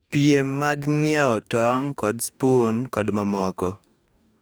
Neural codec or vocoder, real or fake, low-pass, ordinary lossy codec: codec, 44.1 kHz, 2.6 kbps, DAC; fake; none; none